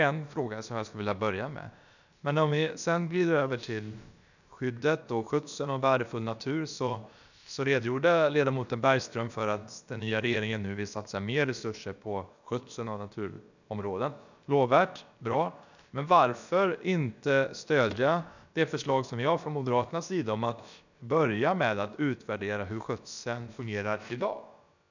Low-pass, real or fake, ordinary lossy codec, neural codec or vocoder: 7.2 kHz; fake; none; codec, 16 kHz, about 1 kbps, DyCAST, with the encoder's durations